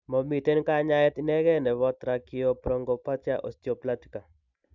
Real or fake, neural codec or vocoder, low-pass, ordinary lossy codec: real; none; 7.2 kHz; none